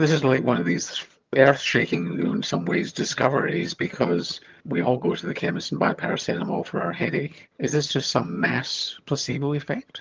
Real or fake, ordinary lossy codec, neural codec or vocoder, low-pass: fake; Opus, 32 kbps; vocoder, 22.05 kHz, 80 mel bands, HiFi-GAN; 7.2 kHz